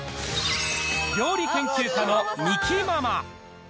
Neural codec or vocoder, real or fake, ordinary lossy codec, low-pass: none; real; none; none